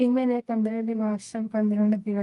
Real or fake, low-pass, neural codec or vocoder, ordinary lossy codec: fake; 10.8 kHz; codec, 24 kHz, 0.9 kbps, WavTokenizer, medium music audio release; Opus, 24 kbps